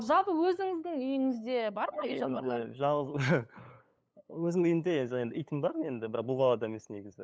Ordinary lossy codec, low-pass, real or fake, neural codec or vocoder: none; none; fake; codec, 16 kHz, 8 kbps, FunCodec, trained on LibriTTS, 25 frames a second